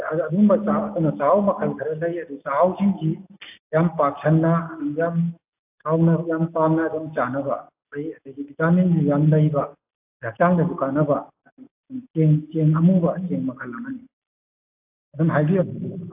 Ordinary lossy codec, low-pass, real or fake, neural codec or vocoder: AAC, 32 kbps; 3.6 kHz; real; none